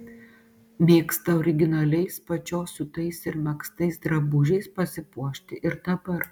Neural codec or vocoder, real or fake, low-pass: none; real; 19.8 kHz